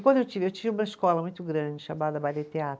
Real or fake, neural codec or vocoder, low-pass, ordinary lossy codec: real; none; none; none